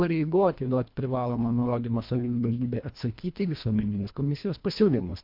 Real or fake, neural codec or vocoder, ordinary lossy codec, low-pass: fake; codec, 24 kHz, 1.5 kbps, HILCodec; MP3, 48 kbps; 5.4 kHz